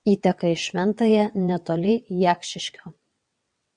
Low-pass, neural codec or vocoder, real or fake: 9.9 kHz; vocoder, 22.05 kHz, 80 mel bands, WaveNeXt; fake